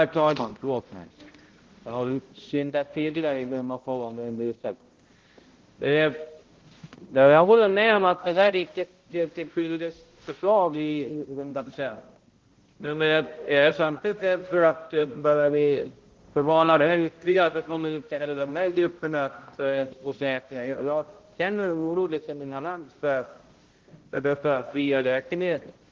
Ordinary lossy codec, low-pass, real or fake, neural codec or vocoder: Opus, 16 kbps; 7.2 kHz; fake; codec, 16 kHz, 0.5 kbps, X-Codec, HuBERT features, trained on balanced general audio